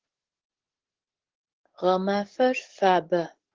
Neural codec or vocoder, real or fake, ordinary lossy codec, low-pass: codec, 44.1 kHz, 7.8 kbps, DAC; fake; Opus, 16 kbps; 7.2 kHz